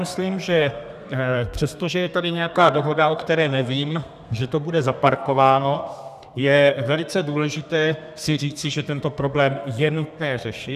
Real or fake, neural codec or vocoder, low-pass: fake; codec, 32 kHz, 1.9 kbps, SNAC; 14.4 kHz